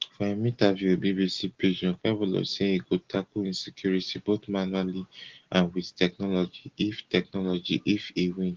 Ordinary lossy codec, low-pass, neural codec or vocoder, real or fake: Opus, 16 kbps; 7.2 kHz; none; real